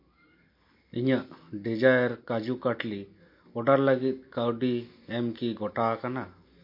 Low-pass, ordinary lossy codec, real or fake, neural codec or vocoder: 5.4 kHz; MP3, 32 kbps; real; none